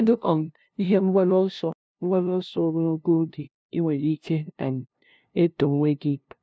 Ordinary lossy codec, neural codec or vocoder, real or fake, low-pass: none; codec, 16 kHz, 0.5 kbps, FunCodec, trained on LibriTTS, 25 frames a second; fake; none